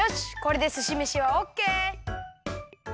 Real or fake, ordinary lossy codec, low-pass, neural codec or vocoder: real; none; none; none